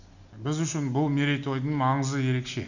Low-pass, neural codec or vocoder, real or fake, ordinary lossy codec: 7.2 kHz; none; real; none